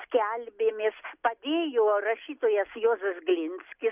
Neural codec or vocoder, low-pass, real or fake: none; 3.6 kHz; real